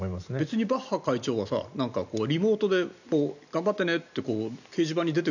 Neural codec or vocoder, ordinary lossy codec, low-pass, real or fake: none; none; 7.2 kHz; real